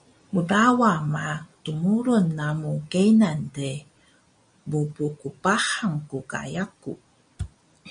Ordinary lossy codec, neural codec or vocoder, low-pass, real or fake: MP3, 96 kbps; none; 9.9 kHz; real